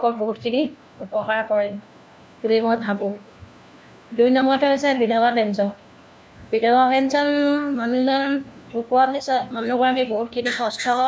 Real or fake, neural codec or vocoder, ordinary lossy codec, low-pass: fake; codec, 16 kHz, 1 kbps, FunCodec, trained on LibriTTS, 50 frames a second; none; none